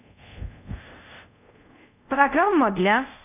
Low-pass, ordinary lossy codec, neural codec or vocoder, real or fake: 3.6 kHz; none; codec, 24 kHz, 0.5 kbps, DualCodec; fake